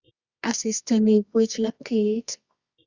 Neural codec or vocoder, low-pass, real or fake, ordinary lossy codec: codec, 24 kHz, 0.9 kbps, WavTokenizer, medium music audio release; 7.2 kHz; fake; Opus, 64 kbps